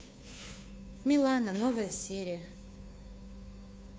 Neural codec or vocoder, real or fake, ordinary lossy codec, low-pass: codec, 16 kHz, 2 kbps, FunCodec, trained on Chinese and English, 25 frames a second; fake; none; none